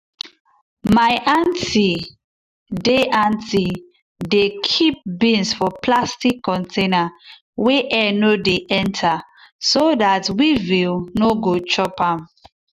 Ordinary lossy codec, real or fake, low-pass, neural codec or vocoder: none; real; 14.4 kHz; none